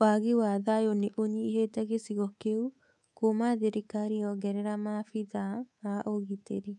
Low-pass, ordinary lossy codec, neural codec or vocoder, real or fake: 10.8 kHz; none; codec, 24 kHz, 3.1 kbps, DualCodec; fake